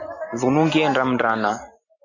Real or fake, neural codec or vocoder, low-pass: real; none; 7.2 kHz